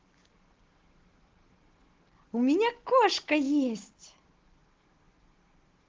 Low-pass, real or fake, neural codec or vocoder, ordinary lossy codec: 7.2 kHz; real; none; Opus, 16 kbps